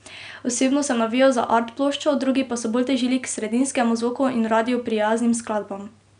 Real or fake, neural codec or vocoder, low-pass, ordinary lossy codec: real; none; 9.9 kHz; none